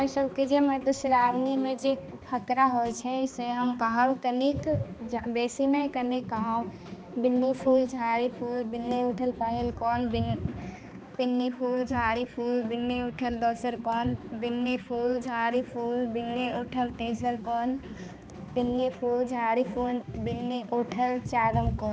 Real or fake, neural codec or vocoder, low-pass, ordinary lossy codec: fake; codec, 16 kHz, 2 kbps, X-Codec, HuBERT features, trained on balanced general audio; none; none